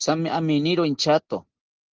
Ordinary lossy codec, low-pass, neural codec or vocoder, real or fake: Opus, 16 kbps; 7.2 kHz; none; real